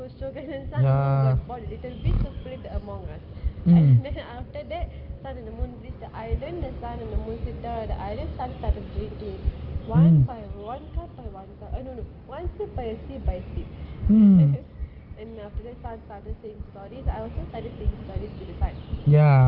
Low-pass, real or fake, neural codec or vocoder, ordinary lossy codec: 5.4 kHz; real; none; Opus, 24 kbps